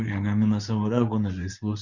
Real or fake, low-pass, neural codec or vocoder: fake; 7.2 kHz; codec, 24 kHz, 0.9 kbps, WavTokenizer, medium speech release version 2